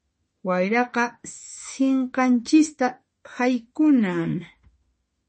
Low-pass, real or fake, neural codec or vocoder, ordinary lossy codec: 10.8 kHz; fake; autoencoder, 48 kHz, 32 numbers a frame, DAC-VAE, trained on Japanese speech; MP3, 32 kbps